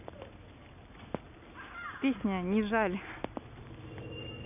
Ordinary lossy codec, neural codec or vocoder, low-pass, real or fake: none; none; 3.6 kHz; real